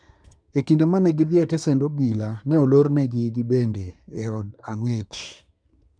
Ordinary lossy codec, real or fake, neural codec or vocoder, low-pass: none; fake; codec, 24 kHz, 1 kbps, SNAC; 9.9 kHz